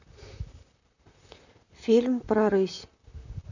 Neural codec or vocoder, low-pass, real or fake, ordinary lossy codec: vocoder, 44.1 kHz, 128 mel bands, Pupu-Vocoder; 7.2 kHz; fake; none